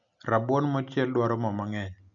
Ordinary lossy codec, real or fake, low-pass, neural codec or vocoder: none; real; 7.2 kHz; none